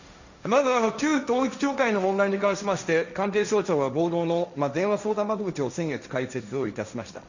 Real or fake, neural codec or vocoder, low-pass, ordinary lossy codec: fake; codec, 16 kHz, 1.1 kbps, Voila-Tokenizer; 7.2 kHz; none